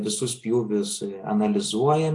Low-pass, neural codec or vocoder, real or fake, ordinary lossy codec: 10.8 kHz; none; real; AAC, 48 kbps